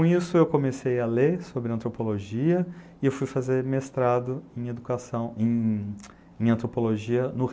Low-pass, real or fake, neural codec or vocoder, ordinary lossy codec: none; real; none; none